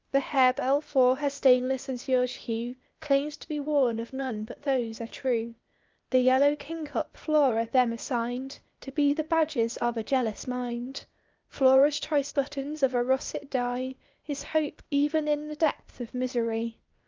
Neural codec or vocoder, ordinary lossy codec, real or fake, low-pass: codec, 16 kHz, 0.8 kbps, ZipCodec; Opus, 24 kbps; fake; 7.2 kHz